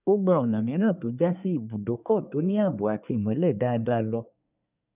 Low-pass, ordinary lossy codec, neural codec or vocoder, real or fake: 3.6 kHz; none; codec, 24 kHz, 1 kbps, SNAC; fake